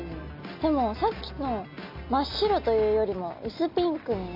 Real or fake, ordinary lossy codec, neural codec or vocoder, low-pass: real; none; none; 5.4 kHz